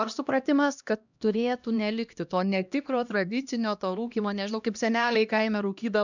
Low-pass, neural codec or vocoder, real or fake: 7.2 kHz; codec, 16 kHz, 1 kbps, X-Codec, HuBERT features, trained on LibriSpeech; fake